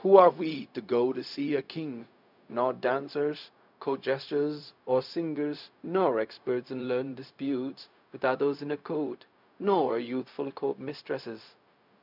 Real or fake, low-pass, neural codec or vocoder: fake; 5.4 kHz; codec, 16 kHz, 0.4 kbps, LongCat-Audio-Codec